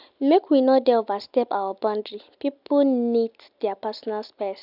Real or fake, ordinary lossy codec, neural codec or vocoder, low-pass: real; none; none; 5.4 kHz